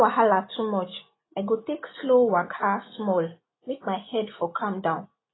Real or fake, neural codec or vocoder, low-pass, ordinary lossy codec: real; none; 7.2 kHz; AAC, 16 kbps